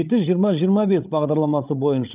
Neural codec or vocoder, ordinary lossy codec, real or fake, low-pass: codec, 16 kHz, 16 kbps, FreqCodec, larger model; Opus, 24 kbps; fake; 3.6 kHz